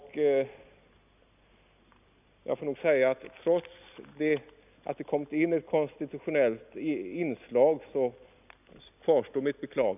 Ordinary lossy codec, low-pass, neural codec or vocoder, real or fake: none; 3.6 kHz; none; real